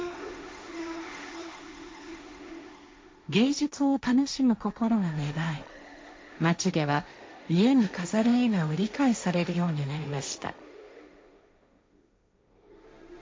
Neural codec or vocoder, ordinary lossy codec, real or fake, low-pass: codec, 16 kHz, 1.1 kbps, Voila-Tokenizer; none; fake; none